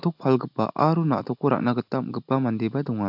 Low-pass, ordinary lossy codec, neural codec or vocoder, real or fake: 5.4 kHz; AAC, 48 kbps; none; real